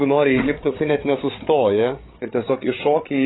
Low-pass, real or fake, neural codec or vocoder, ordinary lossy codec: 7.2 kHz; fake; codec, 16 kHz, 8 kbps, FreqCodec, larger model; AAC, 16 kbps